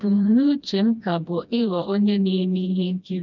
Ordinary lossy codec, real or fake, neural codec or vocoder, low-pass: none; fake; codec, 16 kHz, 1 kbps, FreqCodec, smaller model; 7.2 kHz